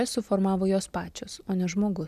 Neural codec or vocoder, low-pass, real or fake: none; 14.4 kHz; real